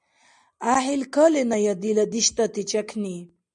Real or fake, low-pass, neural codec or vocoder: real; 10.8 kHz; none